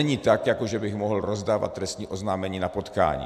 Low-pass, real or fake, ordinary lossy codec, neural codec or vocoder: 14.4 kHz; real; MP3, 96 kbps; none